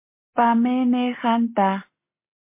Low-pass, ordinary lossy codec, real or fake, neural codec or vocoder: 3.6 kHz; MP3, 24 kbps; real; none